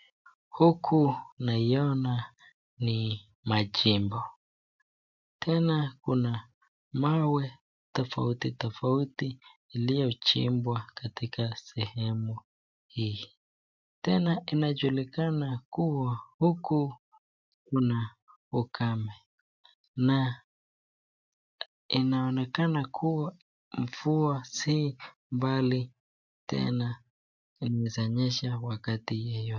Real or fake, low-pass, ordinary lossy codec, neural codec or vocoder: real; 7.2 kHz; MP3, 64 kbps; none